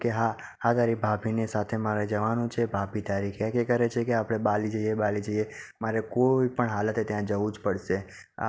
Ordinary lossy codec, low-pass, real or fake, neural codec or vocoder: none; none; real; none